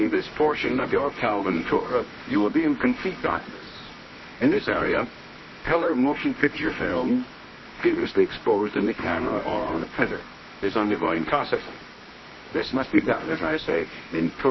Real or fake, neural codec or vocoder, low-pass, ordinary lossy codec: fake; codec, 24 kHz, 0.9 kbps, WavTokenizer, medium music audio release; 7.2 kHz; MP3, 24 kbps